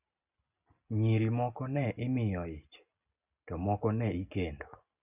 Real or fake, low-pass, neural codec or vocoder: real; 3.6 kHz; none